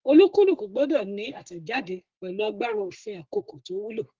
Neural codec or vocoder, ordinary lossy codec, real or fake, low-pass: codec, 44.1 kHz, 3.4 kbps, Pupu-Codec; Opus, 16 kbps; fake; 7.2 kHz